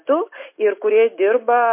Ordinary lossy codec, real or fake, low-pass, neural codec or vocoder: MP3, 24 kbps; real; 3.6 kHz; none